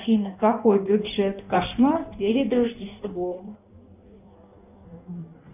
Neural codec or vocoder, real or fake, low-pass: codec, 16 kHz in and 24 kHz out, 1.1 kbps, FireRedTTS-2 codec; fake; 3.6 kHz